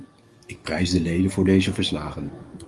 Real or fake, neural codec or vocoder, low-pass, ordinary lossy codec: fake; codec, 44.1 kHz, 7.8 kbps, DAC; 10.8 kHz; Opus, 32 kbps